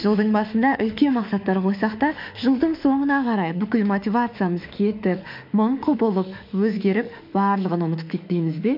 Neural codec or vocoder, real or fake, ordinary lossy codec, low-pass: autoencoder, 48 kHz, 32 numbers a frame, DAC-VAE, trained on Japanese speech; fake; MP3, 48 kbps; 5.4 kHz